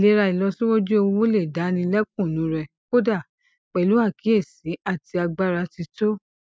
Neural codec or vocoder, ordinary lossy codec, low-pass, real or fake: none; none; none; real